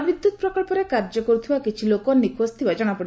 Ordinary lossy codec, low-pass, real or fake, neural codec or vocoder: none; none; real; none